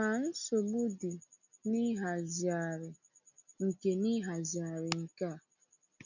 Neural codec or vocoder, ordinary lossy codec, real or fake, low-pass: none; none; real; 7.2 kHz